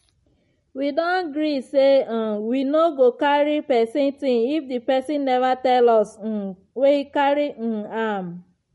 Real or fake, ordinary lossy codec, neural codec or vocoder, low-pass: real; MP3, 48 kbps; none; 14.4 kHz